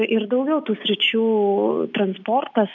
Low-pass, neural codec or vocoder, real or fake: 7.2 kHz; none; real